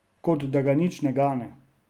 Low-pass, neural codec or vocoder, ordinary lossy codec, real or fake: 19.8 kHz; none; Opus, 24 kbps; real